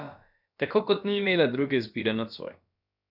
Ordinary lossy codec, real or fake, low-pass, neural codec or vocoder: none; fake; 5.4 kHz; codec, 16 kHz, about 1 kbps, DyCAST, with the encoder's durations